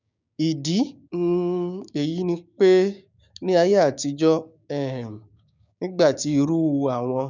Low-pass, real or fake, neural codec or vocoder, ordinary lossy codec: 7.2 kHz; fake; codec, 16 kHz, 6 kbps, DAC; none